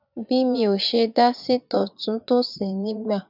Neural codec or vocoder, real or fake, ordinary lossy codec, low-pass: vocoder, 22.05 kHz, 80 mel bands, Vocos; fake; none; 5.4 kHz